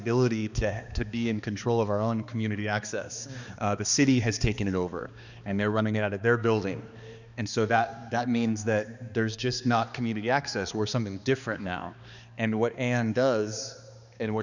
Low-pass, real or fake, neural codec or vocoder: 7.2 kHz; fake; codec, 16 kHz, 2 kbps, X-Codec, HuBERT features, trained on balanced general audio